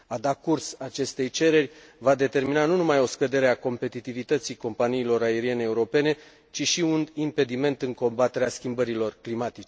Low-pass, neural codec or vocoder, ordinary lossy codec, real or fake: none; none; none; real